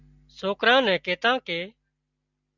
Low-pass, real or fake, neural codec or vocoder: 7.2 kHz; real; none